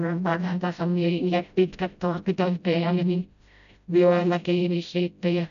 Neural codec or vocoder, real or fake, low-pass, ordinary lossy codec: codec, 16 kHz, 0.5 kbps, FreqCodec, smaller model; fake; 7.2 kHz; none